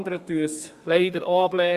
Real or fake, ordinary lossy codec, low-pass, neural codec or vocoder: fake; Opus, 64 kbps; 14.4 kHz; codec, 32 kHz, 1.9 kbps, SNAC